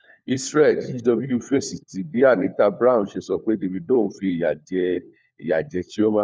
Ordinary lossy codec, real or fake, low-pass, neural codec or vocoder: none; fake; none; codec, 16 kHz, 4 kbps, FunCodec, trained on LibriTTS, 50 frames a second